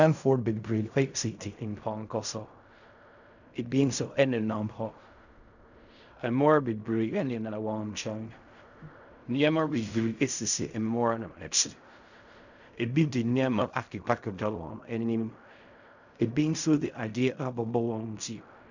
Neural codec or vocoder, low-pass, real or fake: codec, 16 kHz in and 24 kHz out, 0.4 kbps, LongCat-Audio-Codec, fine tuned four codebook decoder; 7.2 kHz; fake